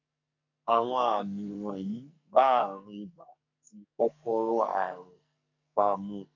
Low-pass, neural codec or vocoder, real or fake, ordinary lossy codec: 7.2 kHz; codec, 44.1 kHz, 2.6 kbps, SNAC; fake; none